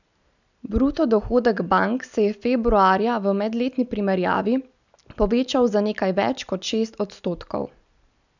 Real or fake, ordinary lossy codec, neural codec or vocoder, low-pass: real; none; none; 7.2 kHz